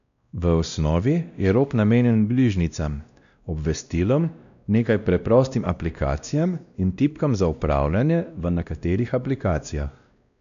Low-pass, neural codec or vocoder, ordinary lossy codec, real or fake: 7.2 kHz; codec, 16 kHz, 1 kbps, X-Codec, WavLM features, trained on Multilingual LibriSpeech; none; fake